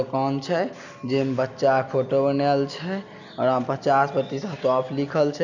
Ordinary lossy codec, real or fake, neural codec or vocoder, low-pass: none; real; none; 7.2 kHz